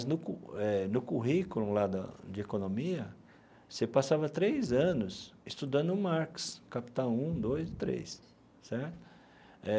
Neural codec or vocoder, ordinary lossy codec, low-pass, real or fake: none; none; none; real